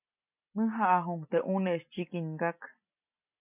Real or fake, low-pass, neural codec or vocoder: real; 3.6 kHz; none